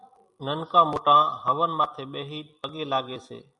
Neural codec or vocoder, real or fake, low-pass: vocoder, 44.1 kHz, 128 mel bands every 256 samples, BigVGAN v2; fake; 10.8 kHz